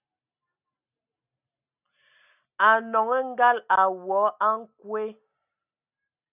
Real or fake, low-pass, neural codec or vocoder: real; 3.6 kHz; none